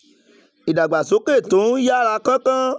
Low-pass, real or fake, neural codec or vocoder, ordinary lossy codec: none; real; none; none